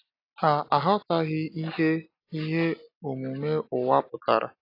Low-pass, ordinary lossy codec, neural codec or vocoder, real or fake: 5.4 kHz; AAC, 32 kbps; none; real